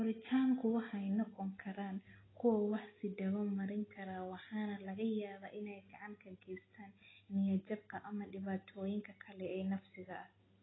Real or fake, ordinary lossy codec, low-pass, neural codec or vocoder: real; AAC, 16 kbps; 7.2 kHz; none